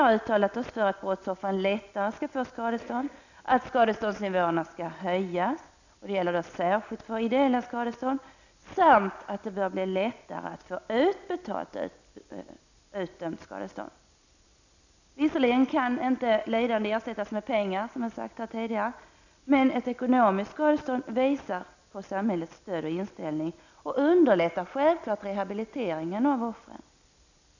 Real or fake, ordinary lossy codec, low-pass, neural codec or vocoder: real; none; 7.2 kHz; none